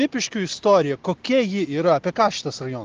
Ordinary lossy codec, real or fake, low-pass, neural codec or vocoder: Opus, 16 kbps; real; 7.2 kHz; none